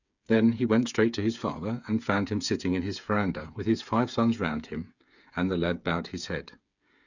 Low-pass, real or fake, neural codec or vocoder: 7.2 kHz; fake; codec, 16 kHz, 8 kbps, FreqCodec, smaller model